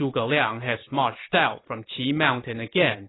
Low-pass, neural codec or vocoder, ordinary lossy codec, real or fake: 7.2 kHz; vocoder, 44.1 kHz, 128 mel bands every 512 samples, BigVGAN v2; AAC, 16 kbps; fake